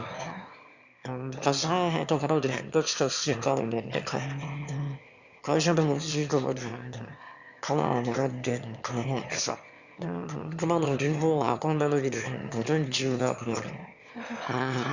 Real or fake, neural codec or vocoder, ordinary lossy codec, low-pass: fake; autoencoder, 22.05 kHz, a latent of 192 numbers a frame, VITS, trained on one speaker; Opus, 64 kbps; 7.2 kHz